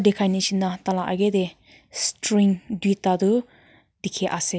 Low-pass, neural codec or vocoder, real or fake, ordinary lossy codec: none; none; real; none